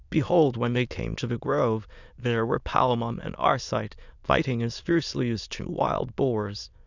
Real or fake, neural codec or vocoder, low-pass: fake; autoencoder, 22.05 kHz, a latent of 192 numbers a frame, VITS, trained on many speakers; 7.2 kHz